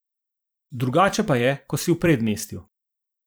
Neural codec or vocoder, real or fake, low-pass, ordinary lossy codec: vocoder, 44.1 kHz, 128 mel bands every 512 samples, BigVGAN v2; fake; none; none